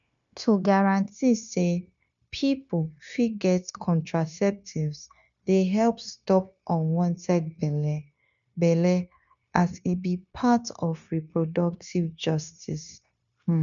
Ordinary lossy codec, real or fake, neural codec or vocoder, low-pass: MP3, 96 kbps; fake; codec, 16 kHz, 0.9 kbps, LongCat-Audio-Codec; 7.2 kHz